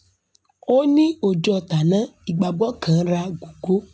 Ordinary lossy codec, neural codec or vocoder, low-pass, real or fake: none; none; none; real